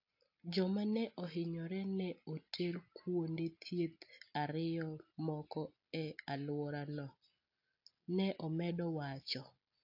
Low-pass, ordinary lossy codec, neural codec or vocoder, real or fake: 5.4 kHz; AAC, 48 kbps; none; real